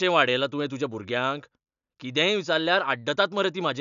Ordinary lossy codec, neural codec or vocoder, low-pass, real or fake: none; none; 7.2 kHz; real